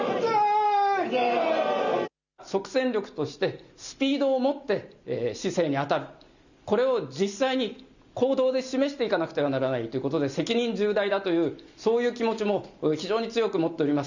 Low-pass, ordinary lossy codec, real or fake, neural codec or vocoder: 7.2 kHz; none; real; none